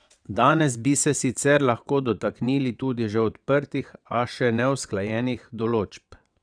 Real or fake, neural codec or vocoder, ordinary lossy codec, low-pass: fake; vocoder, 22.05 kHz, 80 mel bands, WaveNeXt; none; 9.9 kHz